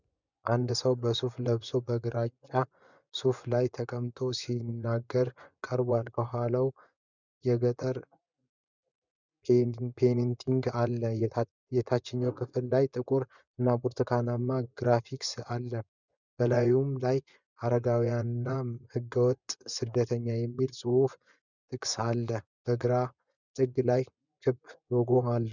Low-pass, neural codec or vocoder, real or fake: 7.2 kHz; vocoder, 24 kHz, 100 mel bands, Vocos; fake